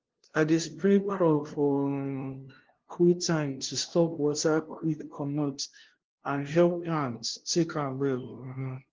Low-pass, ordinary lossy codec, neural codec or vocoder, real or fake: 7.2 kHz; Opus, 16 kbps; codec, 16 kHz, 0.5 kbps, FunCodec, trained on LibriTTS, 25 frames a second; fake